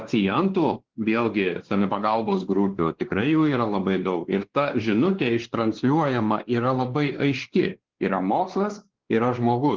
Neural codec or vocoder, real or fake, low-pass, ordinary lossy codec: codec, 16 kHz, 2 kbps, X-Codec, WavLM features, trained on Multilingual LibriSpeech; fake; 7.2 kHz; Opus, 16 kbps